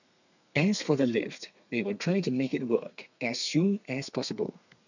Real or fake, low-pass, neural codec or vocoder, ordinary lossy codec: fake; 7.2 kHz; codec, 32 kHz, 1.9 kbps, SNAC; none